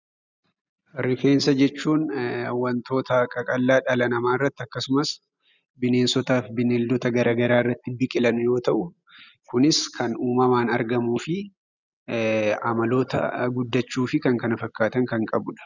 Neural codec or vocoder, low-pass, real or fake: none; 7.2 kHz; real